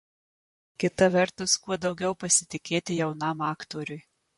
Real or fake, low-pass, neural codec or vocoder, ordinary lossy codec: fake; 14.4 kHz; vocoder, 44.1 kHz, 128 mel bands, Pupu-Vocoder; MP3, 48 kbps